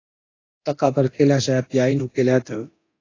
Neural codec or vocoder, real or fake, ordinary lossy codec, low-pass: codec, 24 kHz, 0.9 kbps, DualCodec; fake; AAC, 32 kbps; 7.2 kHz